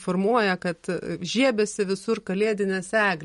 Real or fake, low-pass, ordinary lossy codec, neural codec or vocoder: real; 19.8 kHz; MP3, 48 kbps; none